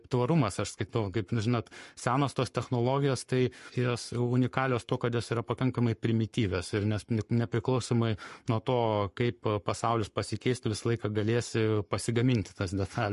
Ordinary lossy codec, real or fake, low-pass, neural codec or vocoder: MP3, 48 kbps; fake; 14.4 kHz; codec, 44.1 kHz, 7.8 kbps, Pupu-Codec